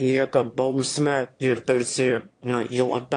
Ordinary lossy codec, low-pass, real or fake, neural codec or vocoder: AAC, 48 kbps; 9.9 kHz; fake; autoencoder, 22.05 kHz, a latent of 192 numbers a frame, VITS, trained on one speaker